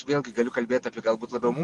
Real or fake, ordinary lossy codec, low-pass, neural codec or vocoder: real; MP3, 96 kbps; 10.8 kHz; none